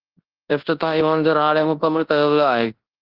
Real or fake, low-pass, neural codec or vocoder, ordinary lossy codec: fake; 5.4 kHz; codec, 24 kHz, 0.9 kbps, WavTokenizer, large speech release; Opus, 16 kbps